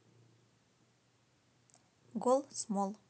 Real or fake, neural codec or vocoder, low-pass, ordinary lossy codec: real; none; none; none